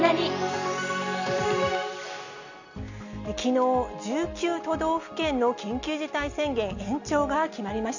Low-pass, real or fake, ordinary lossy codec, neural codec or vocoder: 7.2 kHz; real; none; none